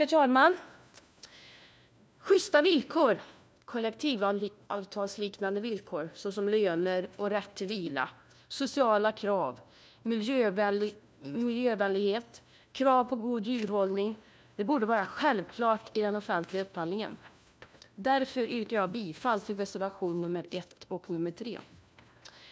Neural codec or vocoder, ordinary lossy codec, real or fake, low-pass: codec, 16 kHz, 1 kbps, FunCodec, trained on LibriTTS, 50 frames a second; none; fake; none